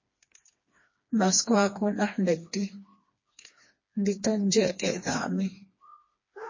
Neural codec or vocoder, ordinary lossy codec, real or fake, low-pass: codec, 16 kHz, 2 kbps, FreqCodec, smaller model; MP3, 32 kbps; fake; 7.2 kHz